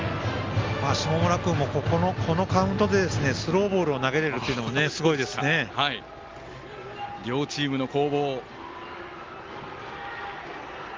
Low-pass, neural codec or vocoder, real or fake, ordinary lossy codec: 7.2 kHz; none; real; Opus, 32 kbps